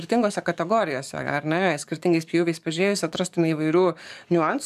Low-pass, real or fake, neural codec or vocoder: 14.4 kHz; fake; codec, 44.1 kHz, 7.8 kbps, DAC